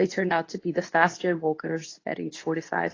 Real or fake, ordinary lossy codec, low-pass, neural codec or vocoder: fake; AAC, 32 kbps; 7.2 kHz; codec, 24 kHz, 0.9 kbps, WavTokenizer, medium speech release version 2